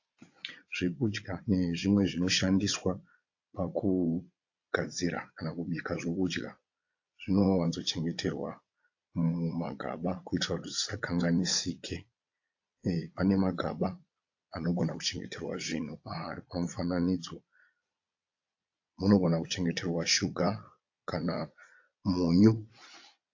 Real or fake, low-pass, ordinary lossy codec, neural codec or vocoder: fake; 7.2 kHz; AAC, 48 kbps; vocoder, 22.05 kHz, 80 mel bands, Vocos